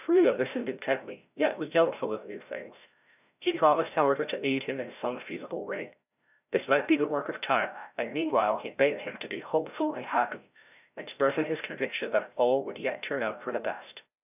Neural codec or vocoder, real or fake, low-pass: codec, 16 kHz, 0.5 kbps, FreqCodec, larger model; fake; 3.6 kHz